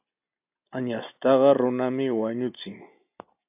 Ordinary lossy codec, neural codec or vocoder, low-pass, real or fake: AAC, 32 kbps; none; 3.6 kHz; real